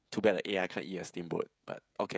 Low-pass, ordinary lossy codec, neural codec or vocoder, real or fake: none; none; codec, 16 kHz, 16 kbps, FreqCodec, smaller model; fake